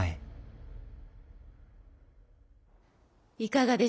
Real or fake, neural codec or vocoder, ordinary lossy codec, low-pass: real; none; none; none